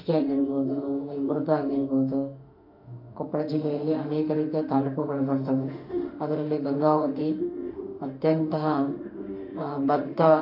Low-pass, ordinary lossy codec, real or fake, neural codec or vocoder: 5.4 kHz; none; fake; autoencoder, 48 kHz, 32 numbers a frame, DAC-VAE, trained on Japanese speech